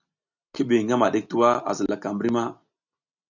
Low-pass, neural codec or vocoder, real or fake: 7.2 kHz; none; real